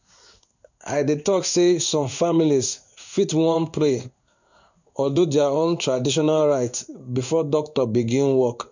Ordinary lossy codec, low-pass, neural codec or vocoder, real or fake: none; 7.2 kHz; codec, 16 kHz in and 24 kHz out, 1 kbps, XY-Tokenizer; fake